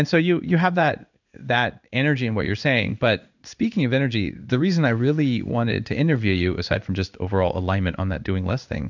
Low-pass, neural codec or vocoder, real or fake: 7.2 kHz; codec, 16 kHz in and 24 kHz out, 1 kbps, XY-Tokenizer; fake